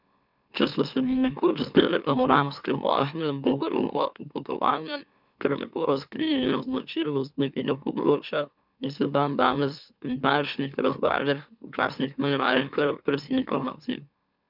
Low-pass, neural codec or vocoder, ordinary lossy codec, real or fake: 5.4 kHz; autoencoder, 44.1 kHz, a latent of 192 numbers a frame, MeloTTS; none; fake